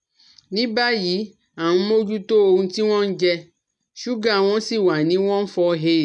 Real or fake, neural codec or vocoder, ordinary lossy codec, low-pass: real; none; none; 10.8 kHz